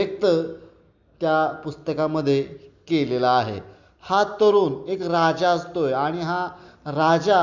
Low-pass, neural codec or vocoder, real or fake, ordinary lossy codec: 7.2 kHz; none; real; Opus, 64 kbps